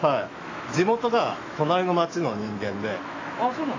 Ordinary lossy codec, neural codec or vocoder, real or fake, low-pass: AAC, 32 kbps; autoencoder, 48 kHz, 128 numbers a frame, DAC-VAE, trained on Japanese speech; fake; 7.2 kHz